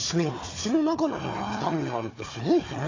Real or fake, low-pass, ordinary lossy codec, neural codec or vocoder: fake; 7.2 kHz; AAC, 32 kbps; codec, 16 kHz, 4 kbps, FunCodec, trained on Chinese and English, 50 frames a second